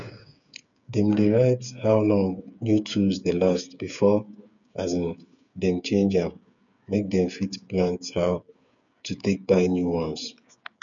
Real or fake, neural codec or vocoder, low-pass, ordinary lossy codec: fake; codec, 16 kHz, 8 kbps, FreqCodec, smaller model; 7.2 kHz; none